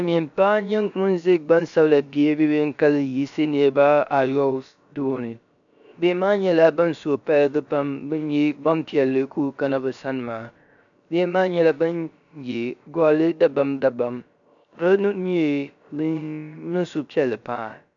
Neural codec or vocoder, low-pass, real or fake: codec, 16 kHz, about 1 kbps, DyCAST, with the encoder's durations; 7.2 kHz; fake